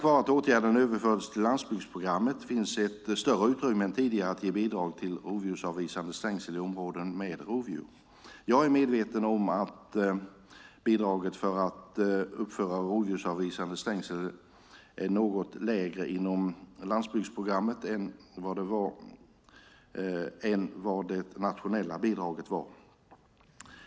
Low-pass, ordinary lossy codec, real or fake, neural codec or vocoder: none; none; real; none